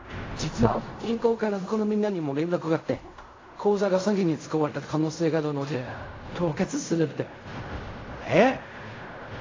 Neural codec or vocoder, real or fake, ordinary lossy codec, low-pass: codec, 16 kHz in and 24 kHz out, 0.4 kbps, LongCat-Audio-Codec, fine tuned four codebook decoder; fake; AAC, 32 kbps; 7.2 kHz